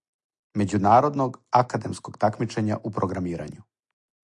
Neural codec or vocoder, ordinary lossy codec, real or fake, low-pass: none; AAC, 64 kbps; real; 10.8 kHz